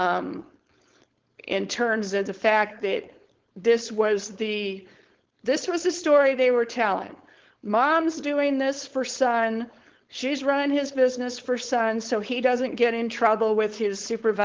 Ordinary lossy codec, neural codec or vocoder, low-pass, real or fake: Opus, 16 kbps; codec, 16 kHz, 4.8 kbps, FACodec; 7.2 kHz; fake